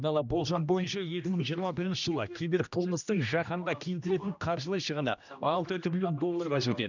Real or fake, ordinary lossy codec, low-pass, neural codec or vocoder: fake; none; 7.2 kHz; codec, 16 kHz, 1 kbps, X-Codec, HuBERT features, trained on general audio